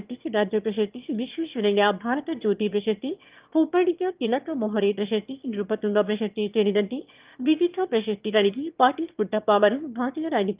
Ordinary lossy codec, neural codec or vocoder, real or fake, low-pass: Opus, 32 kbps; autoencoder, 22.05 kHz, a latent of 192 numbers a frame, VITS, trained on one speaker; fake; 3.6 kHz